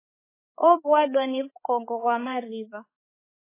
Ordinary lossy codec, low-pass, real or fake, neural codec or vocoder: MP3, 16 kbps; 3.6 kHz; fake; codec, 16 kHz, 4 kbps, X-Codec, HuBERT features, trained on balanced general audio